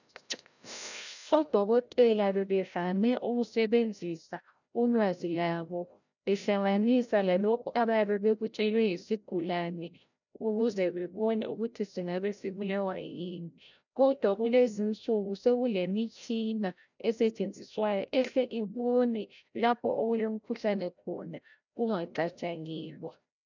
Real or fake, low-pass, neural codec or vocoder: fake; 7.2 kHz; codec, 16 kHz, 0.5 kbps, FreqCodec, larger model